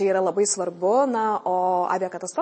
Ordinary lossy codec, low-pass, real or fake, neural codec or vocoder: MP3, 32 kbps; 9.9 kHz; real; none